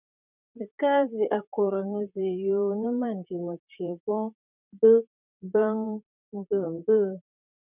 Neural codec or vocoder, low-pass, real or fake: vocoder, 44.1 kHz, 128 mel bands, Pupu-Vocoder; 3.6 kHz; fake